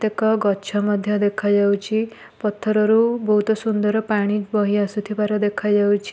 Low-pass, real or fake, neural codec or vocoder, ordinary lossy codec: none; real; none; none